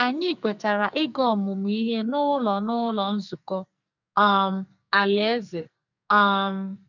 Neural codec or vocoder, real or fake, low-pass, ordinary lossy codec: codec, 44.1 kHz, 2.6 kbps, SNAC; fake; 7.2 kHz; none